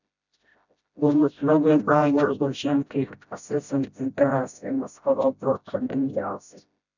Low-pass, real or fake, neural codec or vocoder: 7.2 kHz; fake; codec, 16 kHz, 0.5 kbps, FreqCodec, smaller model